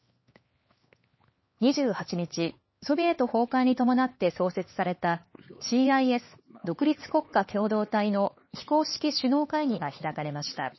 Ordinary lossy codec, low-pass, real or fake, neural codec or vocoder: MP3, 24 kbps; 7.2 kHz; fake; codec, 16 kHz, 4 kbps, X-Codec, HuBERT features, trained on LibriSpeech